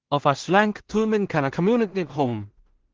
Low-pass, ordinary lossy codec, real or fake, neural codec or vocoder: 7.2 kHz; Opus, 16 kbps; fake; codec, 16 kHz in and 24 kHz out, 0.4 kbps, LongCat-Audio-Codec, two codebook decoder